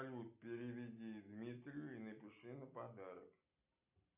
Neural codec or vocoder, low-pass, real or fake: none; 3.6 kHz; real